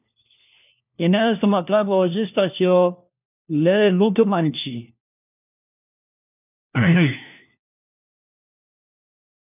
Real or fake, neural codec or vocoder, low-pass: fake; codec, 16 kHz, 1 kbps, FunCodec, trained on LibriTTS, 50 frames a second; 3.6 kHz